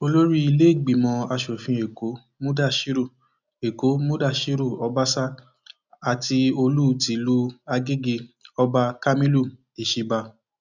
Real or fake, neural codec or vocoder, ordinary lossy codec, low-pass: real; none; none; 7.2 kHz